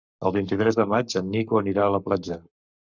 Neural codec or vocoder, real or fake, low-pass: codec, 44.1 kHz, 7.8 kbps, Pupu-Codec; fake; 7.2 kHz